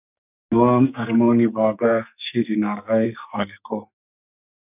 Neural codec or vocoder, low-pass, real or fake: codec, 44.1 kHz, 2.6 kbps, SNAC; 3.6 kHz; fake